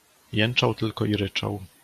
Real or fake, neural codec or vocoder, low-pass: real; none; 14.4 kHz